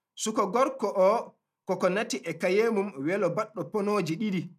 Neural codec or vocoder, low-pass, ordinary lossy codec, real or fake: none; 14.4 kHz; none; real